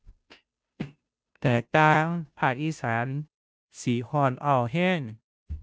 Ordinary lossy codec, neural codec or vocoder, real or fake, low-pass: none; codec, 16 kHz, 0.5 kbps, FunCodec, trained on Chinese and English, 25 frames a second; fake; none